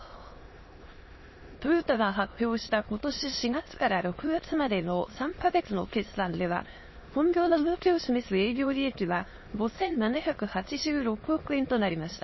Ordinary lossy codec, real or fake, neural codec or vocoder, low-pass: MP3, 24 kbps; fake; autoencoder, 22.05 kHz, a latent of 192 numbers a frame, VITS, trained on many speakers; 7.2 kHz